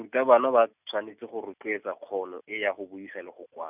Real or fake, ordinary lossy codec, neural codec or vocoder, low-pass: real; none; none; 3.6 kHz